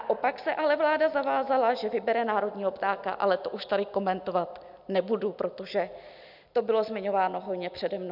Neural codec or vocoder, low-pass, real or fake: none; 5.4 kHz; real